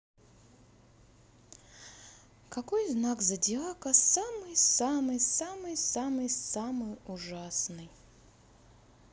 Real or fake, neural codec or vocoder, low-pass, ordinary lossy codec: real; none; none; none